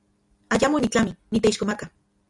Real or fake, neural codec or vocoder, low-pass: real; none; 10.8 kHz